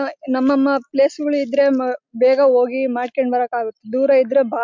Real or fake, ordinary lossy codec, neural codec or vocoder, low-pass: real; MP3, 64 kbps; none; 7.2 kHz